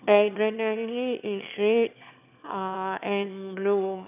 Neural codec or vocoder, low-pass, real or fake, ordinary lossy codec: autoencoder, 22.05 kHz, a latent of 192 numbers a frame, VITS, trained on one speaker; 3.6 kHz; fake; none